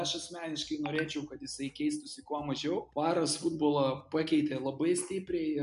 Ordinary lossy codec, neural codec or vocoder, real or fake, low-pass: AAC, 96 kbps; none; real; 10.8 kHz